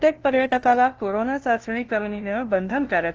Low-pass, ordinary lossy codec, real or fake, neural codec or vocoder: 7.2 kHz; Opus, 16 kbps; fake; codec, 16 kHz, 0.5 kbps, FunCodec, trained on LibriTTS, 25 frames a second